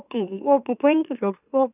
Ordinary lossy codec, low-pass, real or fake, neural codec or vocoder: none; 3.6 kHz; fake; autoencoder, 44.1 kHz, a latent of 192 numbers a frame, MeloTTS